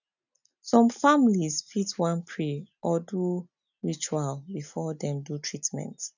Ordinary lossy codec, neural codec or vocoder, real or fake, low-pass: none; none; real; 7.2 kHz